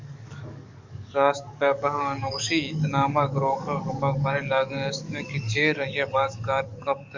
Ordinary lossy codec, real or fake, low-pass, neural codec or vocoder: MP3, 64 kbps; fake; 7.2 kHz; codec, 44.1 kHz, 7.8 kbps, DAC